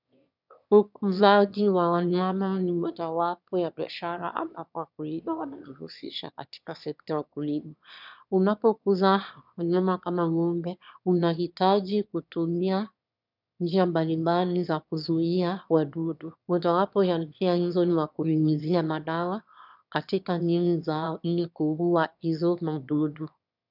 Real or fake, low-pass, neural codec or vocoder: fake; 5.4 kHz; autoencoder, 22.05 kHz, a latent of 192 numbers a frame, VITS, trained on one speaker